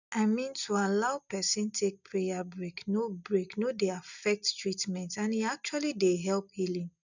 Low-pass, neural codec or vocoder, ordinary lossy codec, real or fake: 7.2 kHz; none; none; real